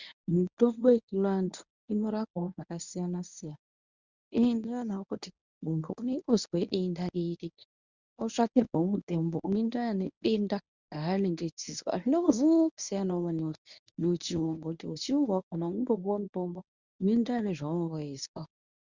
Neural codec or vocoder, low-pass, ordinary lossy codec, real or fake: codec, 24 kHz, 0.9 kbps, WavTokenizer, medium speech release version 1; 7.2 kHz; Opus, 64 kbps; fake